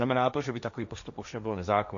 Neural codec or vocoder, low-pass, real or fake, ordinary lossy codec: codec, 16 kHz, 1.1 kbps, Voila-Tokenizer; 7.2 kHz; fake; MP3, 96 kbps